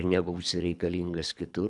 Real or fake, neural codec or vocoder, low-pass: fake; codec, 24 kHz, 3 kbps, HILCodec; 10.8 kHz